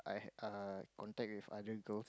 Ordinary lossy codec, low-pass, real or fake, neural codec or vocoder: none; none; real; none